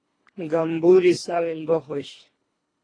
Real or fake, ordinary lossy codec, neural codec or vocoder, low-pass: fake; AAC, 32 kbps; codec, 24 kHz, 1.5 kbps, HILCodec; 9.9 kHz